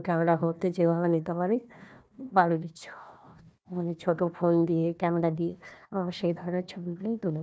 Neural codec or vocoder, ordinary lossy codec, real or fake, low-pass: codec, 16 kHz, 1 kbps, FunCodec, trained on Chinese and English, 50 frames a second; none; fake; none